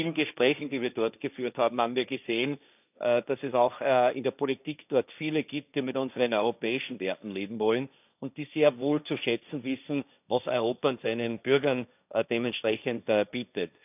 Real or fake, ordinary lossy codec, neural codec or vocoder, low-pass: fake; none; codec, 16 kHz, 1.1 kbps, Voila-Tokenizer; 3.6 kHz